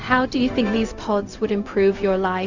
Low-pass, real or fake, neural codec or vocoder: 7.2 kHz; fake; codec, 16 kHz, 0.4 kbps, LongCat-Audio-Codec